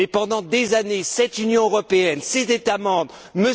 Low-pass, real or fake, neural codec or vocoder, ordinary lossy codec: none; real; none; none